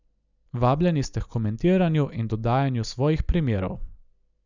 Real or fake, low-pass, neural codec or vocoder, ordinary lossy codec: real; 7.2 kHz; none; none